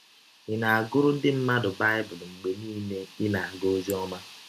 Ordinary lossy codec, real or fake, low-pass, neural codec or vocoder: none; real; 14.4 kHz; none